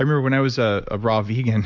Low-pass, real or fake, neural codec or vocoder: 7.2 kHz; real; none